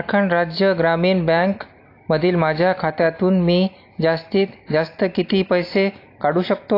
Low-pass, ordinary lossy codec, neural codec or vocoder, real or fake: 5.4 kHz; AAC, 32 kbps; none; real